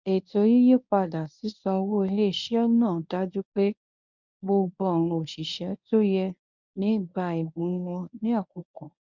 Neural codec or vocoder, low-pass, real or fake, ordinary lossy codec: codec, 24 kHz, 0.9 kbps, WavTokenizer, medium speech release version 1; 7.2 kHz; fake; none